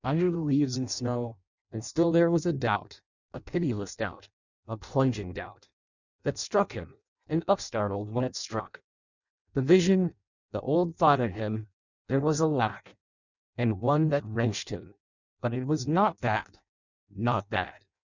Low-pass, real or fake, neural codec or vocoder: 7.2 kHz; fake; codec, 16 kHz in and 24 kHz out, 0.6 kbps, FireRedTTS-2 codec